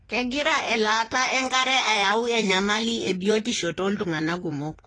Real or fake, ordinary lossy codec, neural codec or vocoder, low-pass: fake; AAC, 32 kbps; codec, 16 kHz in and 24 kHz out, 1.1 kbps, FireRedTTS-2 codec; 9.9 kHz